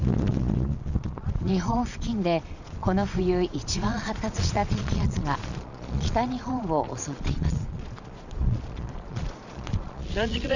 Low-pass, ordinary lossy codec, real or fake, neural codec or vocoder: 7.2 kHz; none; fake; vocoder, 22.05 kHz, 80 mel bands, Vocos